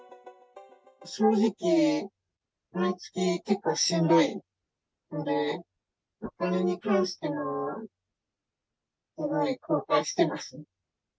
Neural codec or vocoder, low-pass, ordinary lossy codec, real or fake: none; none; none; real